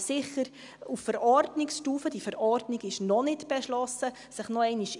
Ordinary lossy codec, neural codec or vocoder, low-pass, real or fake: none; none; 10.8 kHz; real